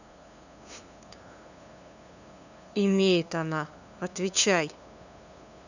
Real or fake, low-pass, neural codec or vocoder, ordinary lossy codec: fake; 7.2 kHz; codec, 16 kHz, 2 kbps, FunCodec, trained on LibriTTS, 25 frames a second; none